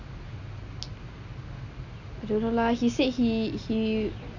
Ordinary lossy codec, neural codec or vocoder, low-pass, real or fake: none; none; 7.2 kHz; real